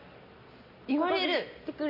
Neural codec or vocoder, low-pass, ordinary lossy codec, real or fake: none; 5.4 kHz; MP3, 24 kbps; real